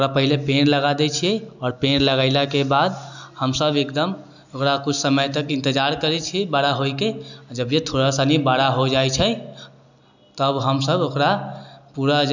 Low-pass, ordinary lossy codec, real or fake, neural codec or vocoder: 7.2 kHz; none; real; none